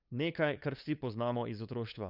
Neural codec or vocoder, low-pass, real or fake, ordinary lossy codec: codec, 16 kHz, 8 kbps, FunCodec, trained on LibriTTS, 25 frames a second; 5.4 kHz; fake; Opus, 64 kbps